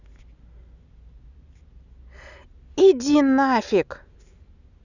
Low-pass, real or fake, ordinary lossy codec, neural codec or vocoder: 7.2 kHz; real; none; none